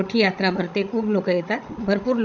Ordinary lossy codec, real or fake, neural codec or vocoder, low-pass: none; fake; codec, 16 kHz, 16 kbps, FreqCodec, larger model; 7.2 kHz